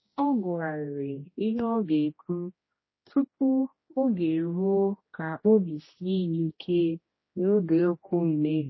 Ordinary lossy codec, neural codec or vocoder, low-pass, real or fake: MP3, 24 kbps; codec, 16 kHz, 1 kbps, X-Codec, HuBERT features, trained on general audio; 7.2 kHz; fake